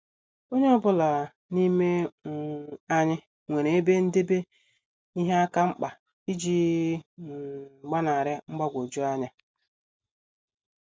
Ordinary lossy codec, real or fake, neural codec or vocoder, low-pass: none; real; none; none